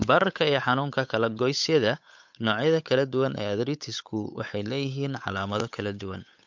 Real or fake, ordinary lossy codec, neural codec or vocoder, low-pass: fake; MP3, 64 kbps; codec, 16 kHz, 8 kbps, FunCodec, trained on Chinese and English, 25 frames a second; 7.2 kHz